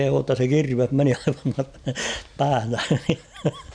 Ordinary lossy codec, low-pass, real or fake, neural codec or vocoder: none; 9.9 kHz; real; none